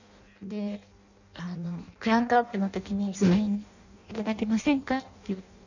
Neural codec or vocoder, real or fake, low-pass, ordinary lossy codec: codec, 16 kHz in and 24 kHz out, 0.6 kbps, FireRedTTS-2 codec; fake; 7.2 kHz; none